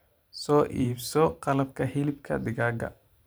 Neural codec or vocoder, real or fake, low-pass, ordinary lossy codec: vocoder, 44.1 kHz, 128 mel bands every 512 samples, BigVGAN v2; fake; none; none